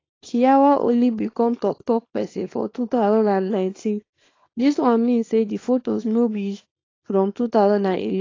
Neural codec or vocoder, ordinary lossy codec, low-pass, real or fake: codec, 24 kHz, 0.9 kbps, WavTokenizer, small release; AAC, 32 kbps; 7.2 kHz; fake